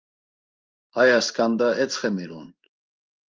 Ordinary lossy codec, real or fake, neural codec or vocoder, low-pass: Opus, 24 kbps; fake; codec, 16 kHz in and 24 kHz out, 1 kbps, XY-Tokenizer; 7.2 kHz